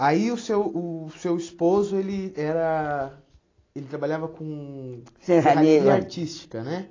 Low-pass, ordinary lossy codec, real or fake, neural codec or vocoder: 7.2 kHz; AAC, 32 kbps; real; none